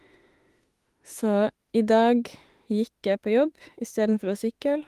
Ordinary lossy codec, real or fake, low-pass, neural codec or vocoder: Opus, 24 kbps; fake; 14.4 kHz; autoencoder, 48 kHz, 32 numbers a frame, DAC-VAE, trained on Japanese speech